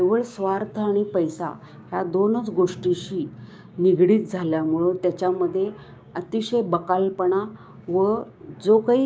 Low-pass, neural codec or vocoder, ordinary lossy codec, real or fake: none; codec, 16 kHz, 6 kbps, DAC; none; fake